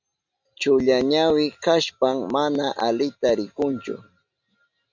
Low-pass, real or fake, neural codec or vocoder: 7.2 kHz; real; none